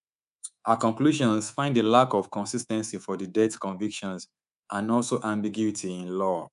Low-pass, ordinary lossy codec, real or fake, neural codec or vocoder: 10.8 kHz; none; fake; codec, 24 kHz, 3.1 kbps, DualCodec